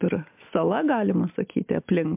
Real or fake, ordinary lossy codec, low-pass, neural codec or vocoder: real; MP3, 32 kbps; 3.6 kHz; none